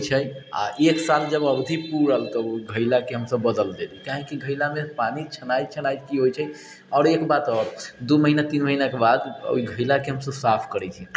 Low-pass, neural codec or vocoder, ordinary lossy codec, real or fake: none; none; none; real